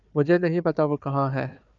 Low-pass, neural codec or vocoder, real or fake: 7.2 kHz; codec, 16 kHz, 4 kbps, FunCodec, trained on Chinese and English, 50 frames a second; fake